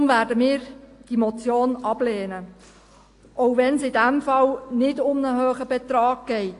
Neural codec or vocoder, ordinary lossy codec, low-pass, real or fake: none; AAC, 48 kbps; 10.8 kHz; real